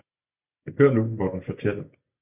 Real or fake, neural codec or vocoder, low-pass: real; none; 3.6 kHz